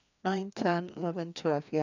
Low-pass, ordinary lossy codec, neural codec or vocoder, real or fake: 7.2 kHz; none; codec, 16 kHz, 2 kbps, FreqCodec, larger model; fake